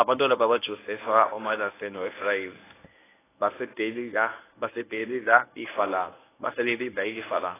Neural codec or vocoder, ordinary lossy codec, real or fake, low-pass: codec, 24 kHz, 0.9 kbps, WavTokenizer, medium speech release version 1; AAC, 16 kbps; fake; 3.6 kHz